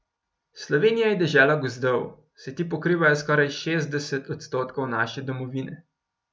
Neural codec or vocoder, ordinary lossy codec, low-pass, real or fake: none; none; none; real